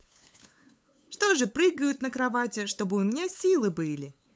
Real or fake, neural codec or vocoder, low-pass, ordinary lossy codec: fake; codec, 16 kHz, 8 kbps, FunCodec, trained on LibriTTS, 25 frames a second; none; none